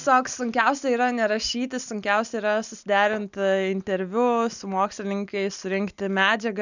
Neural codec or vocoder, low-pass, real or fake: none; 7.2 kHz; real